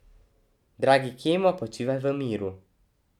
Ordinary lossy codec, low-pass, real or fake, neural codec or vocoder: none; 19.8 kHz; real; none